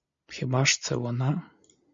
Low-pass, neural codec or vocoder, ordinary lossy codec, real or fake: 7.2 kHz; none; MP3, 48 kbps; real